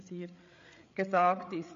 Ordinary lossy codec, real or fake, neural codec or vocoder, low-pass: MP3, 48 kbps; fake; codec, 16 kHz, 8 kbps, FreqCodec, larger model; 7.2 kHz